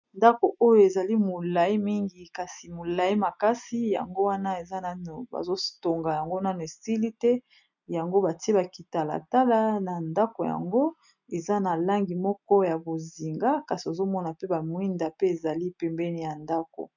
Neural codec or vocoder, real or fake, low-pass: none; real; 7.2 kHz